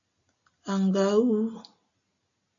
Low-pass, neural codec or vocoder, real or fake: 7.2 kHz; none; real